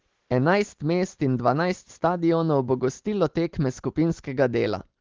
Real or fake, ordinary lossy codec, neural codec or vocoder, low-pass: real; Opus, 16 kbps; none; 7.2 kHz